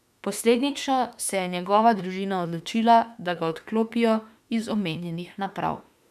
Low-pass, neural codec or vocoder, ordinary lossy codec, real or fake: 14.4 kHz; autoencoder, 48 kHz, 32 numbers a frame, DAC-VAE, trained on Japanese speech; none; fake